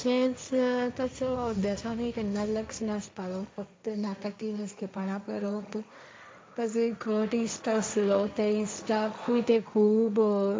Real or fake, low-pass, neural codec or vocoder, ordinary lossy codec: fake; none; codec, 16 kHz, 1.1 kbps, Voila-Tokenizer; none